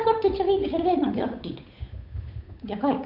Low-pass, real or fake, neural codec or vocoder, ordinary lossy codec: 5.4 kHz; fake; codec, 16 kHz, 8 kbps, FunCodec, trained on Chinese and English, 25 frames a second; none